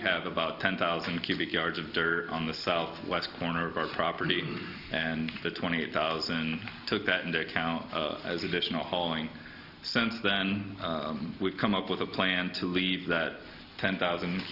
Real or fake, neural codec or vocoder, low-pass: real; none; 5.4 kHz